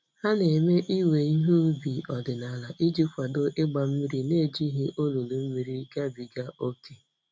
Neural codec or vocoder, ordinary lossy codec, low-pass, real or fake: none; none; none; real